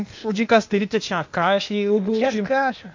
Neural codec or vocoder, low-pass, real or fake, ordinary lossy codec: codec, 16 kHz, 0.8 kbps, ZipCodec; 7.2 kHz; fake; MP3, 48 kbps